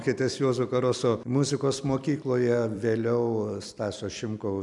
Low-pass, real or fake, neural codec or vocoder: 10.8 kHz; fake; vocoder, 44.1 kHz, 128 mel bands every 256 samples, BigVGAN v2